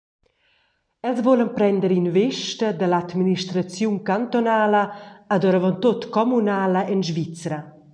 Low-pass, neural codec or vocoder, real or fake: 9.9 kHz; none; real